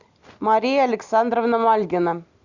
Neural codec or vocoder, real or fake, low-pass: none; real; 7.2 kHz